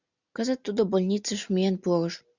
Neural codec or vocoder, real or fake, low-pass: none; real; 7.2 kHz